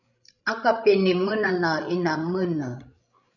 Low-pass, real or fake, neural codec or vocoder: 7.2 kHz; fake; codec, 16 kHz, 16 kbps, FreqCodec, larger model